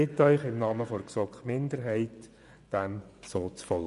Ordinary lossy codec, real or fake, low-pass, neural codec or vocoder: none; fake; 10.8 kHz; vocoder, 24 kHz, 100 mel bands, Vocos